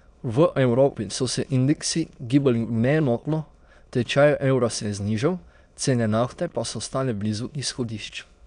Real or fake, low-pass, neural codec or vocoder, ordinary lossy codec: fake; 9.9 kHz; autoencoder, 22.05 kHz, a latent of 192 numbers a frame, VITS, trained on many speakers; none